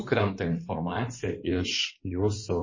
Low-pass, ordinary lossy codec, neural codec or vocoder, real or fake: 7.2 kHz; MP3, 32 kbps; codec, 16 kHz in and 24 kHz out, 2.2 kbps, FireRedTTS-2 codec; fake